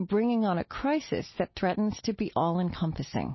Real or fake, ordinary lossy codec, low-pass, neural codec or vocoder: real; MP3, 24 kbps; 7.2 kHz; none